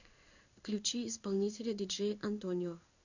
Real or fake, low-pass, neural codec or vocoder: fake; 7.2 kHz; codec, 16 kHz in and 24 kHz out, 1 kbps, XY-Tokenizer